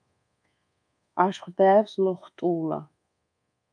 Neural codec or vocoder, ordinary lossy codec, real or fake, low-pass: codec, 24 kHz, 1.2 kbps, DualCodec; MP3, 64 kbps; fake; 9.9 kHz